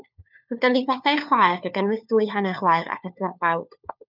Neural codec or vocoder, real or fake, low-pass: codec, 16 kHz, 4 kbps, FunCodec, trained on LibriTTS, 50 frames a second; fake; 5.4 kHz